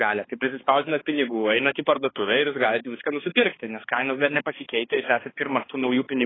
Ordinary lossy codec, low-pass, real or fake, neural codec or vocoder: AAC, 16 kbps; 7.2 kHz; fake; codec, 16 kHz, 2 kbps, X-Codec, HuBERT features, trained on balanced general audio